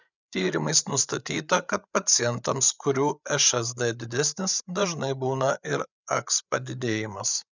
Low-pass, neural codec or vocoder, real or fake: 7.2 kHz; codec, 16 kHz, 8 kbps, FreqCodec, larger model; fake